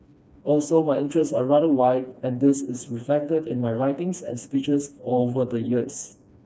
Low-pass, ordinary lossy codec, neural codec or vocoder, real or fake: none; none; codec, 16 kHz, 2 kbps, FreqCodec, smaller model; fake